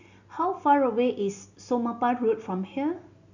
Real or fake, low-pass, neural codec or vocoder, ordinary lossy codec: real; 7.2 kHz; none; none